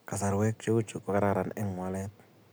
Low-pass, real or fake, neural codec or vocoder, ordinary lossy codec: none; real; none; none